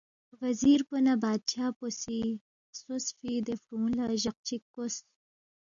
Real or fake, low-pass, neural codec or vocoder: real; 7.2 kHz; none